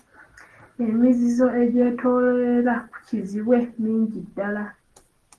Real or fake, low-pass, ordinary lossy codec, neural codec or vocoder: real; 10.8 kHz; Opus, 16 kbps; none